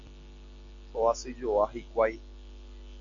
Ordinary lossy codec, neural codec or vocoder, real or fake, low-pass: AAC, 48 kbps; none; real; 7.2 kHz